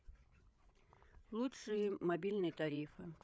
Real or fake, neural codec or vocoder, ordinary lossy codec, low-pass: fake; codec, 16 kHz, 16 kbps, FreqCodec, larger model; none; none